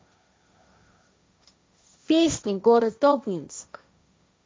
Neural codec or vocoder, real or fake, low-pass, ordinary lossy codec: codec, 16 kHz, 1.1 kbps, Voila-Tokenizer; fake; none; none